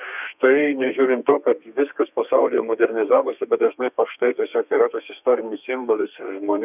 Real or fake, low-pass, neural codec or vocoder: fake; 3.6 kHz; codec, 32 kHz, 1.9 kbps, SNAC